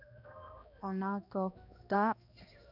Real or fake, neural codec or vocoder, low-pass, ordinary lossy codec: fake; codec, 16 kHz, 1 kbps, X-Codec, HuBERT features, trained on balanced general audio; 5.4 kHz; none